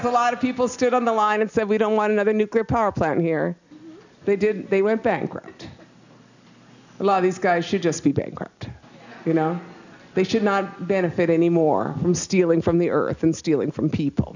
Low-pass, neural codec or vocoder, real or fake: 7.2 kHz; none; real